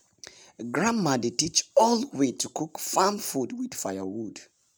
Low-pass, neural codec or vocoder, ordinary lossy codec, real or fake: none; none; none; real